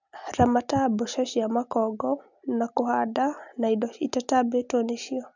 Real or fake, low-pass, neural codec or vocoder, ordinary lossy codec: real; 7.2 kHz; none; none